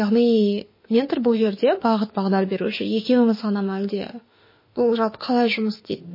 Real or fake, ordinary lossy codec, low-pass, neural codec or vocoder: fake; MP3, 24 kbps; 5.4 kHz; autoencoder, 48 kHz, 32 numbers a frame, DAC-VAE, trained on Japanese speech